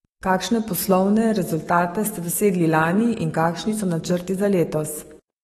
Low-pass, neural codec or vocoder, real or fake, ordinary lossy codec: 19.8 kHz; autoencoder, 48 kHz, 128 numbers a frame, DAC-VAE, trained on Japanese speech; fake; AAC, 32 kbps